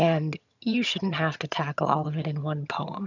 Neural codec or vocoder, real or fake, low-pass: vocoder, 22.05 kHz, 80 mel bands, HiFi-GAN; fake; 7.2 kHz